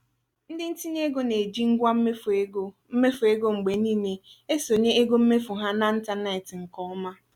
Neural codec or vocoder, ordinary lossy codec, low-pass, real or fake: none; none; 19.8 kHz; real